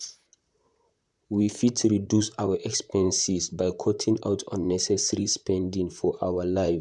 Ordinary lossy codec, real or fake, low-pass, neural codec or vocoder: none; fake; 10.8 kHz; vocoder, 44.1 kHz, 128 mel bands, Pupu-Vocoder